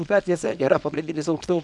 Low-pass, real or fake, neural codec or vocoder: 10.8 kHz; fake; codec, 24 kHz, 0.9 kbps, WavTokenizer, small release